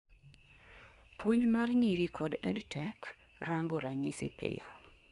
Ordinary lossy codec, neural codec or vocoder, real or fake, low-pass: none; codec, 24 kHz, 1 kbps, SNAC; fake; 10.8 kHz